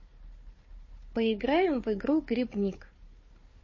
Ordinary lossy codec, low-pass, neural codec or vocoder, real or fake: MP3, 32 kbps; 7.2 kHz; codec, 16 kHz, 4 kbps, FunCodec, trained on Chinese and English, 50 frames a second; fake